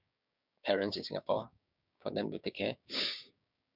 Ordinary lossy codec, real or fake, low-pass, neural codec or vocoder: none; fake; 5.4 kHz; codec, 16 kHz, 6 kbps, DAC